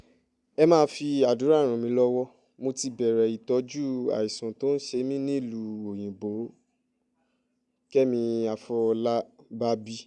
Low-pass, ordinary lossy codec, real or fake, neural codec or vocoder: 10.8 kHz; none; real; none